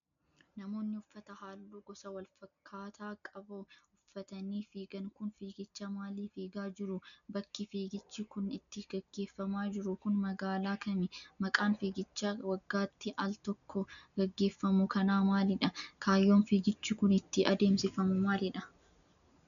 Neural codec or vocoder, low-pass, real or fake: none; 7.2 kHz; real